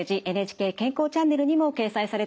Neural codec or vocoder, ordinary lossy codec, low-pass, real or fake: none; none; none; real